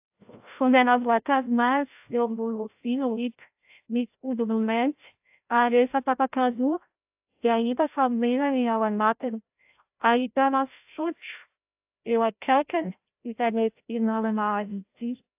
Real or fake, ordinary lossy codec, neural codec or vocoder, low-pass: fake; AAC, 32 kbps; codec, 16 kHz, 0.5 kbps, FreqCodec, larger model; 3.6 kHz